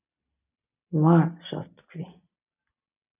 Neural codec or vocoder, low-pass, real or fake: none; 3.6 kHz; real